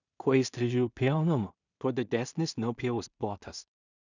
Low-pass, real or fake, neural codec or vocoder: 7.2 kHz; fake; codec, 16 kHz in and 24 kHz out, 0.4 kbps, LongCat-Audio-Codec, two codebook decoder